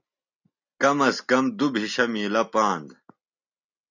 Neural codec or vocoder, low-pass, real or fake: none; 7.2 kHz; real